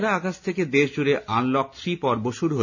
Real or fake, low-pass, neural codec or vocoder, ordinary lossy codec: real; 7.2 kHz; none; none